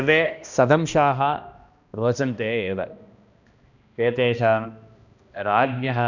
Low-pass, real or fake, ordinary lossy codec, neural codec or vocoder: 7.2 kHz; fake; none; codec, 16 kHz, 1 kbps, X-Codec, HuBERT features, trained on balanced general audio